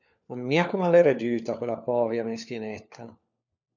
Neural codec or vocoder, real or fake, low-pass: codec, 16 kHz, 4 kbps, FunCodec, trained on LibriTTS, 50 frames a second; fake; 7.2 kHz